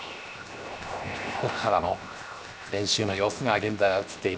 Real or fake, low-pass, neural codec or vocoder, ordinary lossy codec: fake; none; codec, 16 kHz, 0.7 kbps, FocalCodec; none